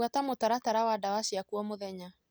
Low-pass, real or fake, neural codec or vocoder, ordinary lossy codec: none; real; none; none